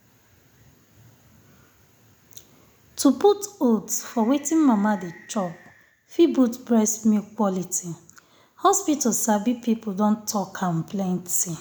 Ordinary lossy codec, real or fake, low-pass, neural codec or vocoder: none; real; none; none